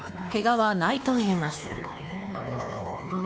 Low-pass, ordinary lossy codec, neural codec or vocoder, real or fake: none; none; codec, 16 kHz, 2 kbps, X-Codec, WavLM features, trained on Multilingual LibriSpeech; fake